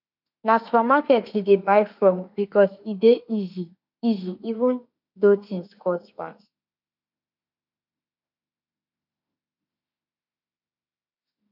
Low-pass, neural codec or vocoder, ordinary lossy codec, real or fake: 5.4 kHz; autoencoder, 48 kHz, 32 numbers a frame, DAC-VAE, trained on Japanese speech; none; fake